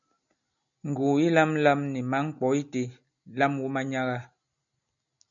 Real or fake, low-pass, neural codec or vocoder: real; 7.2 kHz; none